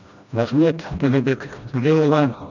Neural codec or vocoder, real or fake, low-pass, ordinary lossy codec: codec, 16 kHz, 1 kbps, FreqCodec, smaller model; fake; 7.2 kHz; none